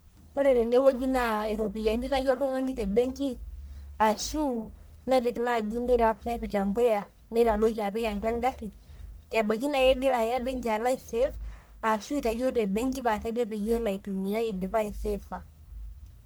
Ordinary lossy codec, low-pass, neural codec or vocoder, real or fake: none; none; codec, 44.1 kHz, 1.7 kbps, Pupu-Codec; fake